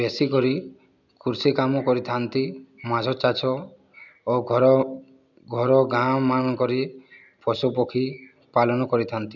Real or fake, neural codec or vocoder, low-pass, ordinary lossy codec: real; none; 7.2 kHz; none